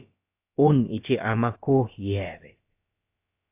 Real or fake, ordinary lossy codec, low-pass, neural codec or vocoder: fake; AAC, 24 kbps; 3.6 kHz; codec, 16 kHz, about 1 kbps, DyCAST, with the encoder's durations